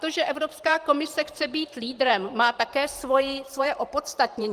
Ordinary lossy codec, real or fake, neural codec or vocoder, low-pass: Opus, 24 kbps; fake; vocoder, 44.1 kHz, 128 mel bands every 512 samples, BigVGAN v2; 14.4 kHz